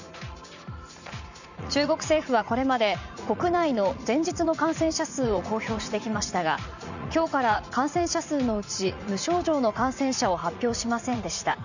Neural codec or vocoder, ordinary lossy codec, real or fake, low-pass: vocoder, 44.1 kHz, 80 mel bands, Vocos; none; fake; 7.2 kHz